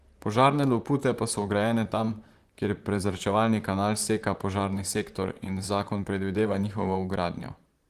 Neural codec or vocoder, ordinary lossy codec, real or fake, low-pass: vocoder, 44.1 kHz, 128 mel bands, Pupu-Vocoder; Opus, 24 kbps; fake; 14.4 kHz